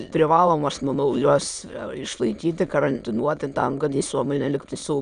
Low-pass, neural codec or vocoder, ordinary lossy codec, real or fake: 9.9 kHz; autoencoder, 22.05 kHz, a latent of 192 numbers a frame, VITS, trained on many speakers; Opus, 64 kbps; fake